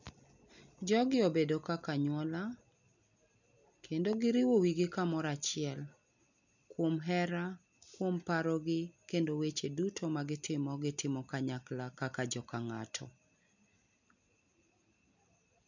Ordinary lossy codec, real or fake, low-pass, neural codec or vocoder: none; real; 7.2 kHz; none